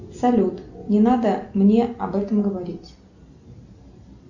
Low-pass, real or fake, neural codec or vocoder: 7.2 kHz; real; none